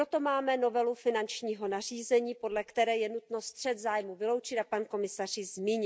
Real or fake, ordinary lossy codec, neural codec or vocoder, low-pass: real; none; none; none